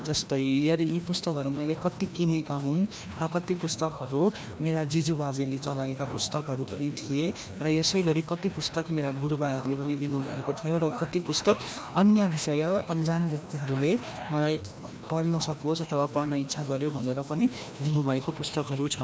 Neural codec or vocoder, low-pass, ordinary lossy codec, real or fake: codec, 16 kHz, 1 kbps, FreqCodec, larger model; none; none; fake